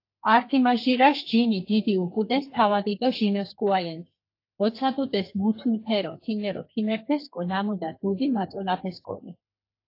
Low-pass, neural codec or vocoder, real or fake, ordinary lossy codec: 5.4 kHz; codec, 32 kHz, 1.9 kbps, SNAC; fake; AAC, 32 kbps